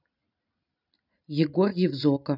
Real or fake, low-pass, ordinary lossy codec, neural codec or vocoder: real; 5.4 kHz; none; none